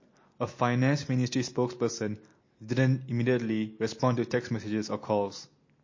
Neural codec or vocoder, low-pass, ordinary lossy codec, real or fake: none; 7.2 kHz; MP3, 32 kbps; real